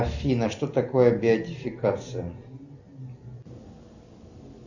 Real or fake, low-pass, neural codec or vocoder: real; 7.2 kHz; none